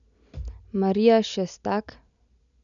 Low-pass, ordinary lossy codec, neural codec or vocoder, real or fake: 7.2 kHz; none; none; real